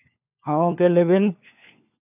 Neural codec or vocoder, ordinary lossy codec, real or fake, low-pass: codec, 16 kHz, 4 kbps, FunCodec, trained on LibriTTS, 50 frames a second; none; fake; 3.6 kHz